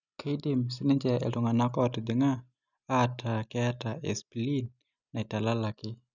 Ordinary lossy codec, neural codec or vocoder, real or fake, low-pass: none; none; real; 7.2 kHz